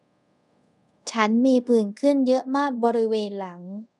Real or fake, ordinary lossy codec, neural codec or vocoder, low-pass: fake; none; codec, 24 kHz, 0.5 kbps, DualCodec; 10.8 kHz